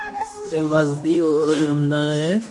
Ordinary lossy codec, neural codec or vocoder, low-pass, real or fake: MP3, 48 kbps; codec, 16 kHz in and 24 kHz out, 0.9 kbps, LongCat-Audio-Codec, fine tuned four codebook decoder; 10.8 kHz; fake